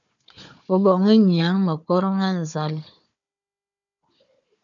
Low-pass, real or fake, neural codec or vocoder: 7.2 kHz; fake; codec, 16 kHz, 4 kbps, FunCodec, trained on Chinese and English, 50 frames a second